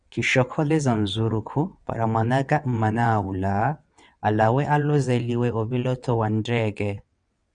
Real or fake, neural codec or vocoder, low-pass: fake; vocoder, 22.05 kHz, 80 mel bands, WaveNeXt; 9.9 kHz